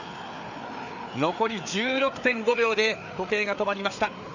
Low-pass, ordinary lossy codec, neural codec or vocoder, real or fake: 7.2 kHz; none; codec, 16 kHz, 4 kbps, FreqCodec, larger model; fake